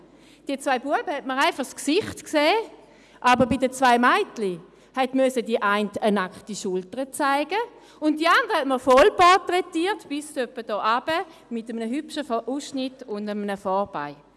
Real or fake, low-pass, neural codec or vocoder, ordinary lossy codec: real; none; none; none